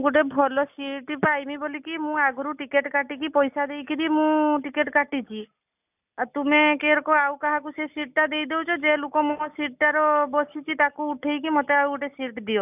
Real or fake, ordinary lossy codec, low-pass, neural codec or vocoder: real; none; 3.6 kHz; none